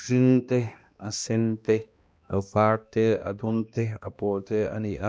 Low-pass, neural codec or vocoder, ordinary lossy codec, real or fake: none; codec, 16 kHz, 1 kbps, X-Codec, HuBERT features, trained on balanced general audio; none; fake